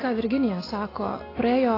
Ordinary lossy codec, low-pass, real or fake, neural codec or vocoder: AAC, 24 kbps; 5.4 kHz; real; none